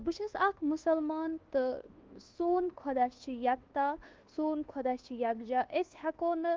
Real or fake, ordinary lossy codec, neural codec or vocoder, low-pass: fake; Opus, 16 kbps; codec, 24 kHz, 1.2 kbps, DualCodec; 7.2 kHz